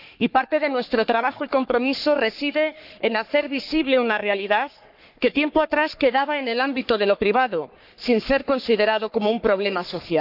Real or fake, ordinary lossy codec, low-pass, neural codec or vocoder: fake; none; 5.4 kHz; codec, 44.1 kHz, 3.4 kbps, Pupu-Codec